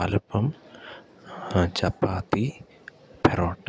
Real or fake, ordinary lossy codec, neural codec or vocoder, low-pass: real; none; none; none